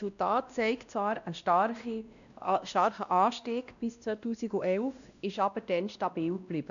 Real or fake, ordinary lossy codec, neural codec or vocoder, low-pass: fake; none; codec, 16 kHz, 1 kbps, X-Codec, WavLM features, trained on Multilingual LibriSpeech; 7.2 kHz